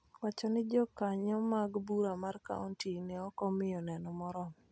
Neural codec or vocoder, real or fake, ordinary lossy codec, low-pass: none; real; none; none